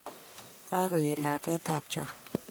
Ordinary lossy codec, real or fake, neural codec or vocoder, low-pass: none; fake; codec, 44.1 kHz, 1.7 kbps, Pupu-Codec; none